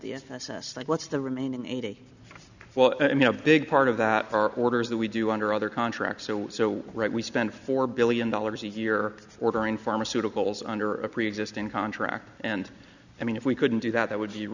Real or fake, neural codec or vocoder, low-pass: real; none; 7.2 kHz